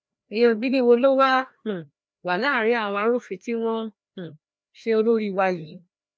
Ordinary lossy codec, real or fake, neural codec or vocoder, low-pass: none; fake; codec, 16 kHz, 1 kbps, FreqCodec, larger model; none